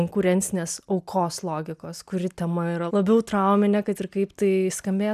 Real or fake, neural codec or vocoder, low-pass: real; none; 14.4 kHz